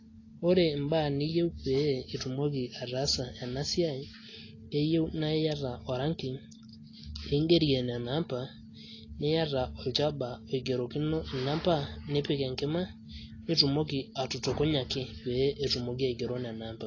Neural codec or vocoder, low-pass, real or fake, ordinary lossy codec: none; 7.2 kHz; real; AAC, 32 kbps